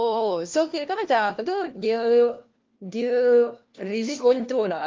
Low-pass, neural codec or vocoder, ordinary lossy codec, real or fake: 7.2 kHz; codec, 16 kHz, 1 kbps, FunCodec, trained on LibriTTS, 50 frames a second; Opus, 32 kbps; fake